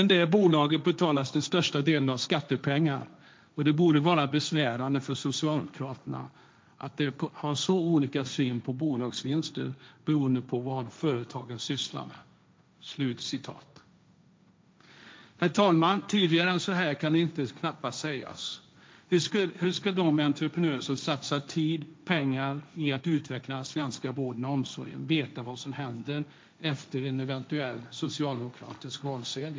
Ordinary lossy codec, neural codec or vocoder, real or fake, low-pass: none; codec, 16 kHz, 1.1 kbps, Voila-Tokenizer; fake; none